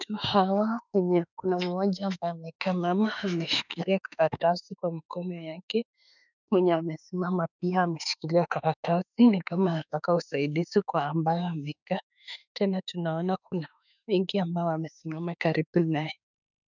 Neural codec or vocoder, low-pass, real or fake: autoencoder, 48 kHz, 32 numbers a frame, DAC-VAE, trained on Japanese speech; 7.2 kHz; fake